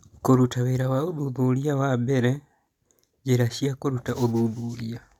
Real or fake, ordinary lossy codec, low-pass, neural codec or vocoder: real; none; 19.8 kHz; none